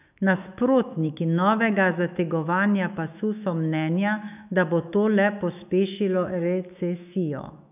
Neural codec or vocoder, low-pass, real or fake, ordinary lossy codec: autoencoder, 48 kHz, 128 numbers a frame, DAC-VAE, trained on Japanese speech; 3.6 kHz; fake; none